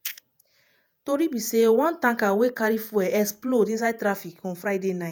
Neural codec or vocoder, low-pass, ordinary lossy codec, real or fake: vocoder, 48 kHz, 128 mel bands, Vocos; none; none; fake